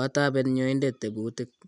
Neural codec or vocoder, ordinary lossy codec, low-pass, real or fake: none; none; 10.8 kHz; real